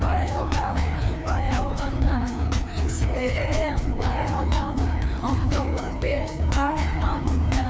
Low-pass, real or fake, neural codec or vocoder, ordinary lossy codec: none; fake; codec, 16 kHz, 2 kbps, FreqCodec, larger model; none